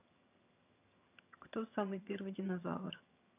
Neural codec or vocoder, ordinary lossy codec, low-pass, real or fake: vocoder, 22.05 kHz, 80 mel bands, HiFi-GAN; none; 3.6 kHz; fake